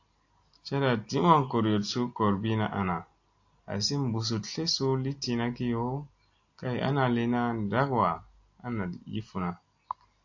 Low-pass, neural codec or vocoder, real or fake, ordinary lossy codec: 7.2 kHz; none; real; AAC, 48 kbps